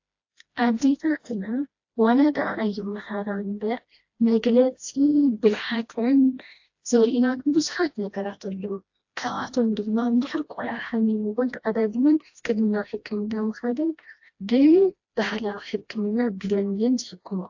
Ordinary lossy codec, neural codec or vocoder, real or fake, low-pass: AAC, 48 kbps; codec, 16 kHz, 1 kbps, FreqCodec, smaller model; fake; 7.2 kHz